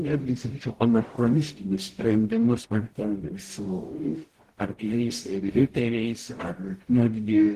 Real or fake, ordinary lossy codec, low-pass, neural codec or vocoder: fake; Opus, 16 kbps; 14.4 kHz; codec, 44.1 kHz, 0.9 kbps, DAC